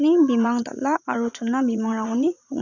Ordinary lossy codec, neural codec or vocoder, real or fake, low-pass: none; vocoder, 44.1 kHz, 80 mel bands, Vocos; fake; 7.2 kHz